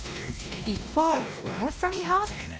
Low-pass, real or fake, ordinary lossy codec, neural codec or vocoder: none; fake; none; codec, 16 kHz, 1 kbps, X-Codec, WavLM features, trained on Multilingual LibriSpeech